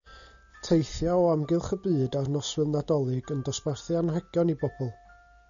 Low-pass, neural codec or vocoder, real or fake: 7.2 kHz; none; real